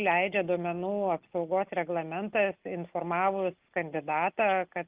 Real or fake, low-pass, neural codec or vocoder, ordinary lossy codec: real; 3.6 kHz; none; Opus, 24 kbps